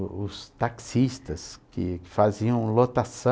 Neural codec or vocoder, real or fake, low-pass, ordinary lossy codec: none; real; none; none